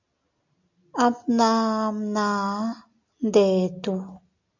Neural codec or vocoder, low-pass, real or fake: none; 7.2 kHz; real